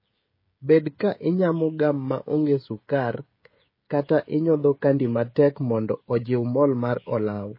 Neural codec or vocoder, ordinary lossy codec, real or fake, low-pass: codec, 16 kHz, 16 kbps, FreqCodec, smaller model; MP3, 24 kbps; fake; 5.4 kHz